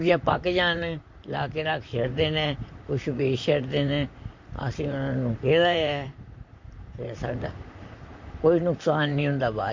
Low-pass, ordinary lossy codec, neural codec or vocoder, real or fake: 7.2 kHz; MP3, 48 kbps; vocoder, 44.1 kHz, 128 mel bands, Pupu-Vocoder; fake